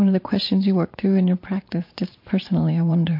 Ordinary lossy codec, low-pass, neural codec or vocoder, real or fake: MP3, 32 kbps; 5.4 kHz; vocoder, 44.1 kHz, 128 mel bands every 512 samples, BigVGAN v2; fake